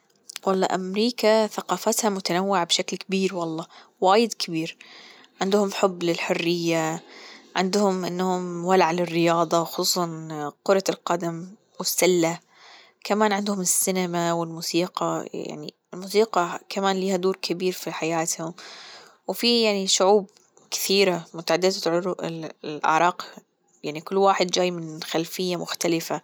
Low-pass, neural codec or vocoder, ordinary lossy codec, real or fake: none; none; none; real